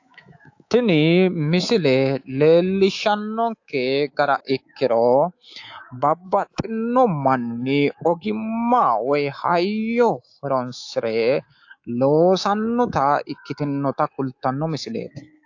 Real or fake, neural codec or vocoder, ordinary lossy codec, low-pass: fake; codec, 24 kHz, 3.1 kbps, DualCodec; AAC, 48 kbps; 7.2 kHz